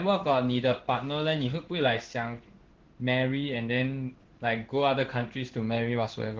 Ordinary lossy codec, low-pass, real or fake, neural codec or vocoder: Opus, 16 kbps; 7.2 kHz; fake; codec, 16 kHz in and 24 kHz out, 1 kbps, XY-Tokenizer